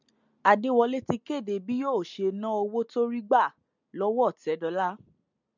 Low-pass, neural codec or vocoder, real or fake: 7.2 kHz; none; real